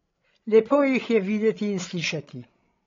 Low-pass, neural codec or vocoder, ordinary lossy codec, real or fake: 7.2 kHz; codec, 16 kHz, 16 kbps, FreqCodec, larger model; AAC, 32 kbps; fake